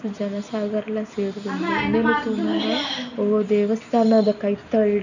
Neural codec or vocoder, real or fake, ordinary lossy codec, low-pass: none; real; none; 7.2 kHz